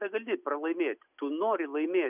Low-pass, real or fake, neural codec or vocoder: 3.6 kHz; real; none